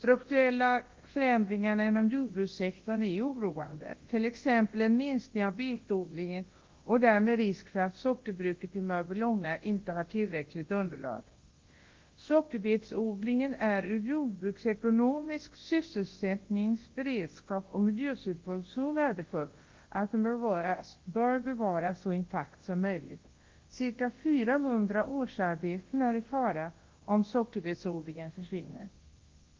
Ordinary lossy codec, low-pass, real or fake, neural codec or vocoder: Opus, 16 kbps; 7.2 kHz; fake; codec, 24 kHz, 0.9 kbps, WavTokenizer, large speech release